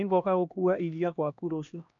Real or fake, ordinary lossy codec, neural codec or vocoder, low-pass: fake; none; codec, 16 kHz, 1 kbps, X-Codec, HuBERT features, trained on LibriSpeech; 7.2 kHz